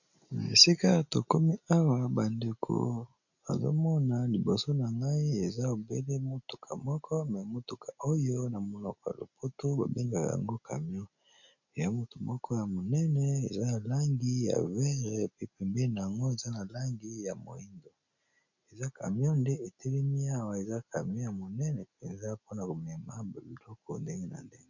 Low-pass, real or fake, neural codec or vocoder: 7.2 kHz; real; none